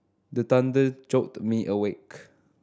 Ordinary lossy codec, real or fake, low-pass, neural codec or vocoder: none; real; none; none